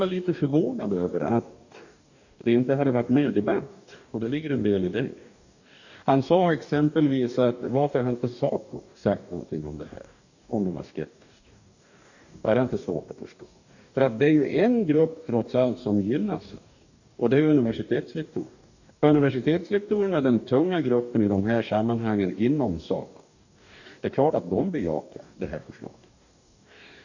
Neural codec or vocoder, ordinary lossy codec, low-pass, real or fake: codec, 44.1 kHz, 2.6 kbps, DAC; none; 7.2 kHz; fake